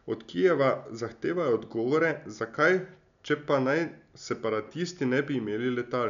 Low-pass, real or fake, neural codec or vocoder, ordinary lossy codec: 7.2 kHz; real; none; none